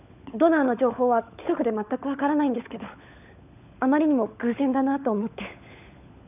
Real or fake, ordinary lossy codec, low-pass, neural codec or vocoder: fake; none; 3.6 kHz; codec, 16 kHz, 16 kbps, FunCodec, trained on LibriTTS, 50 frames a second